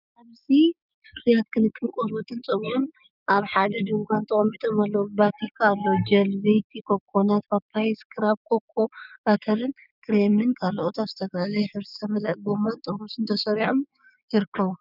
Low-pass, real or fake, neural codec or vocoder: 5.4 kHz; fake; codec, 16 kHz, 6 kbps, DAC